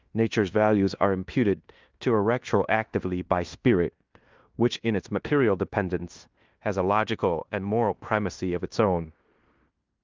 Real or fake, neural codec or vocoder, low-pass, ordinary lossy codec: fake; codec, 16 kHz in and 24 kHz out, 0.9 kbps, LongCat-Audio-Codec, fine tuned four codebook decoder; 7.2 kHz; Opus, 24 kbps